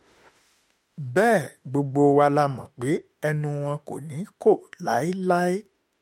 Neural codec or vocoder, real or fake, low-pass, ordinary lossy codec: autoencoder, 48 kHz, 32 numbers a frame, DAC-VAE, trained on Japanese speech; fake; 19.8 kHz; MP3, 64 kbps